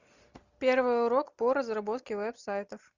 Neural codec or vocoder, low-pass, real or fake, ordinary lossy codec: none; 7.2 kHz; real; Opus, 64 kbps